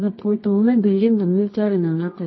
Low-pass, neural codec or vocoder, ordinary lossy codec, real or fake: 7.2 kHz; codec, 24 kHz, 0.9 kbps, WavTokenizer, medium music audio release; MP3, 24 kbps; fake